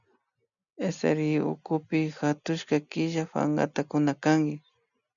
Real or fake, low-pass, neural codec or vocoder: real; 7.2 kHz; none